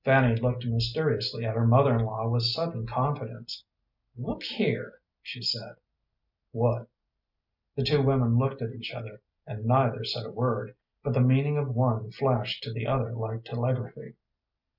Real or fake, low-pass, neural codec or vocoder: real; 5.4 kHz; none